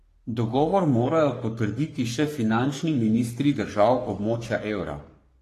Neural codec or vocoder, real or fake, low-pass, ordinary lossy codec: codec, 44.1 kHz, 3.4 kbps, Pupu-Codec; fake; 14.4 kHz; AAC, 48 kbps